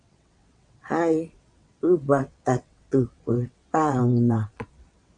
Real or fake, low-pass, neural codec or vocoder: fake; 9.9 kHz; vocoder, 22.05 kHz, 80 mel bands, WaveNeXt